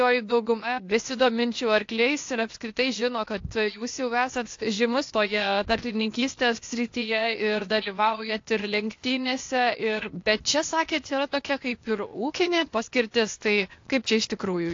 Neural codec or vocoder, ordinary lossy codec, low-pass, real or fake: codec, 16 kHz, 0.8 kbps, ZipCodec; AAC, 48 kbps; 7.2 kHz; fake